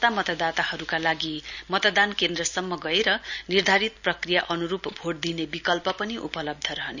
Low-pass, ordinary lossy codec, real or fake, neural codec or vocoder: 7.2 kHz; none; real; none